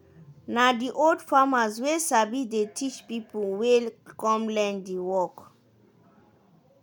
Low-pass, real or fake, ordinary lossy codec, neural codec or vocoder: none; real; none; none